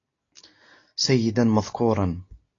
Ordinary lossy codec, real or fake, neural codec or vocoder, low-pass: AAC, 32 kbps; real; none; 7.2 kHz